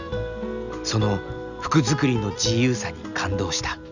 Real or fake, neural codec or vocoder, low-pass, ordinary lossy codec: real; none; 7.2 kHz; none